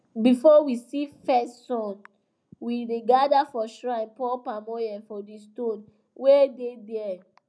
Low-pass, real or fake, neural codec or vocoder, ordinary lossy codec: none; real; none; none